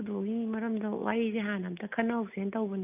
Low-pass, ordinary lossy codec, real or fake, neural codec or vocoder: 3.6 kHz; none; real; none